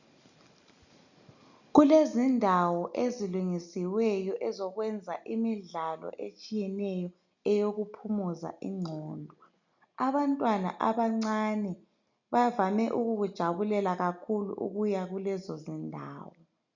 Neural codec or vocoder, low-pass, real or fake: none; 7.2 kHz; real